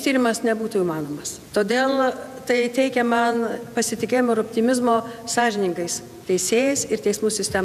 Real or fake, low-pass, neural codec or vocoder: fake; 14.4 kHz; vocoder, 48 kHz, 128 mel bands, Vocos